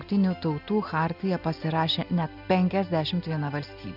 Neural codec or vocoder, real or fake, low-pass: none; real; 5.4 kHz